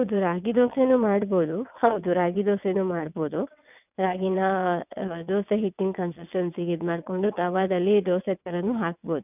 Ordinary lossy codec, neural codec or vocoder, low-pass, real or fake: none; vocoder, 22.05 kHz, 80 mel bands, WaveNeXt; 3.6 kHz; fake